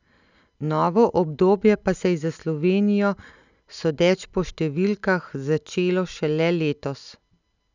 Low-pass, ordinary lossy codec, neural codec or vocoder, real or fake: 7.2 kHz; none; none; real